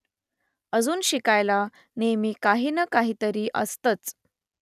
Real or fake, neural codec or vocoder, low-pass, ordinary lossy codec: real; none; 14.4 kHz; none